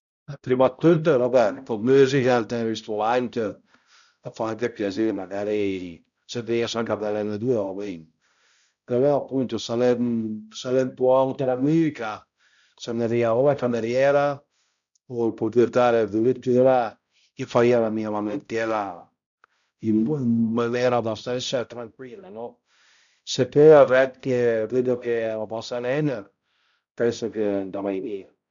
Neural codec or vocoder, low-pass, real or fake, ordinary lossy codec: codec, 16 kHz, 0.5 kbps, X-Codec, HuBERT features, trained on balanced general audio; 7.2 kHz; fake; none